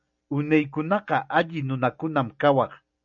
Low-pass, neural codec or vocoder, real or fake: 7.2 kHz; none; real